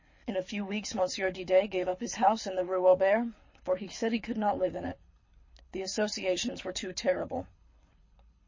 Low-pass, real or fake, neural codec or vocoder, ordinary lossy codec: 7.2 kHz; fake; codec, 24 kHz, 6 kbps, HILCodec; MP3, 32 kbps